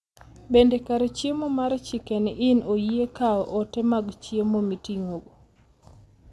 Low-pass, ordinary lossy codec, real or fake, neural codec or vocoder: none; none; real; none